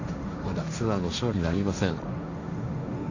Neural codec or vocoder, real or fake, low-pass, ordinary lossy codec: codec, 16 kHz, 1.1 kbps, Voila-Tokenizer; fake; 7.2 kHz; none